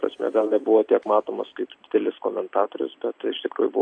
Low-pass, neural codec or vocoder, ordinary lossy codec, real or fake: 9.9 kHz; none; AAC, 48 kbps; real